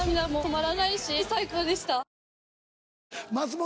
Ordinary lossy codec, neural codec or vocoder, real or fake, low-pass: none; none; real; none